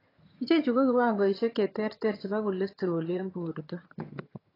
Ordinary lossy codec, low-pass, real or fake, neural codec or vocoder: AAC, 24 kbps; 5.4 kHz; fake; vocoder, 22.05 kHz, 80 mel bands, HiFi-GAN